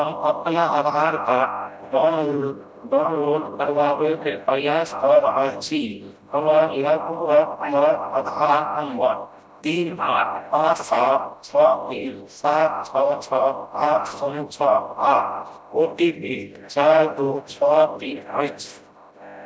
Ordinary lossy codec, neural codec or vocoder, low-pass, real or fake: none; codec, 16 kHz, 0.5 kbps, FreqCodec, smaller model; none; fake